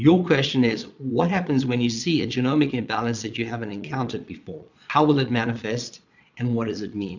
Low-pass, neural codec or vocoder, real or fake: 7.2 kHz; vocoder, 22.05 kHz, 80 mel bands, Vocos; fake